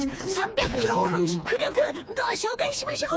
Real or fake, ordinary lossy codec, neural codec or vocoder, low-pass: fake; none; codec, 16 kHz, 2 kbps, FreqCodec, smaller model; none